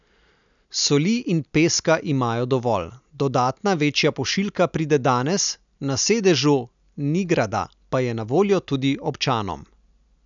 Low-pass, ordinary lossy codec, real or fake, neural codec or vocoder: 7.2 kHz; none; real; none